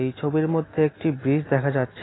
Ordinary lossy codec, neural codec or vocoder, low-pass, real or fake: AAC, 16 kbps; none; 7.2 kHz; real